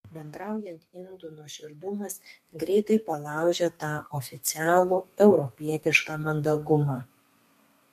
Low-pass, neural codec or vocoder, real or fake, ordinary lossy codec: 14.4 kHz; codec, 32 kHz, 1.9 kbps, SNAC; fake; MP3, 64 kbps